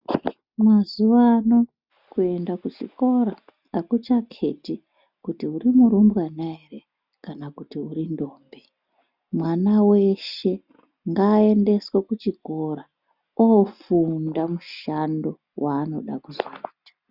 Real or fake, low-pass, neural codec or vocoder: real; 5.4 kHz; none